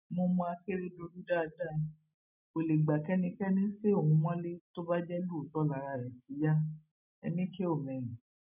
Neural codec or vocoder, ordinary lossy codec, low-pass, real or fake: none; none; 3.6 kHz; real